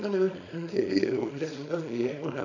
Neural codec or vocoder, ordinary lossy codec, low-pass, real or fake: codec, 24 kHz, 0.9 kbps, WavTokenizer, small release; none; 7.2 kHz; fake